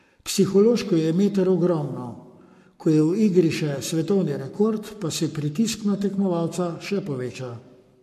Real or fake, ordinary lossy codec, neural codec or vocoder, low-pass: fake; MP3, 64 kbps; codec, 44.1 kHz, 7.8 kbps, Pupu-Codec; 14.4 kHz